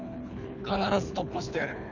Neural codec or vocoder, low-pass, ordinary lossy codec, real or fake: codec, 24 kHz, 3 kbps, HILCodec; 7.2 kHz; none; fake